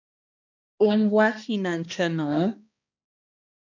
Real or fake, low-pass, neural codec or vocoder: fake; 7.2 kHz; codec, 16 kHz, 1 kbps, X-Codec, HuBERT features, trained on balanced general audio